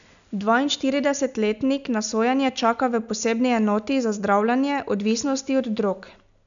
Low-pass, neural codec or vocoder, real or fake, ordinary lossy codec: 7.2 kHz; none; real; none